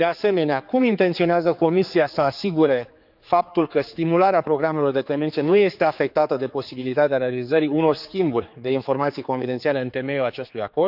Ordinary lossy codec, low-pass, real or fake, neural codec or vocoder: none; 5.4 kHz; fake; codec, 16 kHz, 4 kbps, X-Codec, HuBERT features, trained on general audio